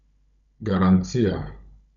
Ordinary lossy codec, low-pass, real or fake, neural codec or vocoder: none; 7.2 kHz; fake; codec, 16 kHz, 16 kbps, FunCodec, trained on Chinese and English, 50 frames a second